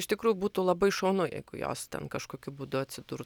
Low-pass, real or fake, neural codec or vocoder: 19.8 kHz; real; none